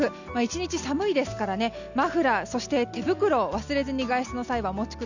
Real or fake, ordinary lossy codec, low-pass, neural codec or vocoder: real; none; 7.2 kHz; none